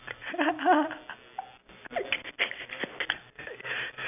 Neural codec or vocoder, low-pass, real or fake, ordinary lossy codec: none; 3.6 kHz; real; none